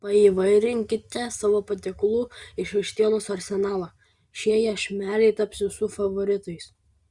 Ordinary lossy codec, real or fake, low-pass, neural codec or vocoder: Opus, 64 kbps; real; 10.8 kHz; none